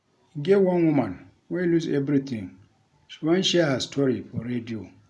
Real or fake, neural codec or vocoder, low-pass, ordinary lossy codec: real; none; none; none